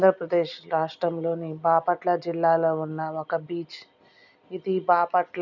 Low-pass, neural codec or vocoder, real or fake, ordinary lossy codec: 7.2 kHz; none; real; none